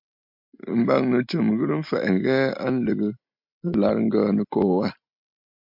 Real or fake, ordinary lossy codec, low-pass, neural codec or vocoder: real; MP3, 48 kbps; 5.4 kHz; none